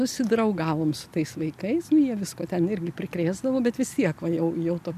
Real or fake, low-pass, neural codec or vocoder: real; 14.4 kHz; none